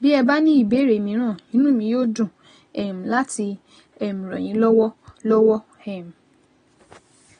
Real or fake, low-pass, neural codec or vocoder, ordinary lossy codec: real; 9.9 kHz; none; AAC, 32 kbps